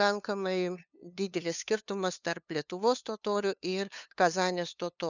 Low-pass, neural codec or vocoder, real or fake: 7.2 kHz; codec, 16 kHz, 2 kbps, FunCodec, trained on LibriTTS, 25 frames a second; fake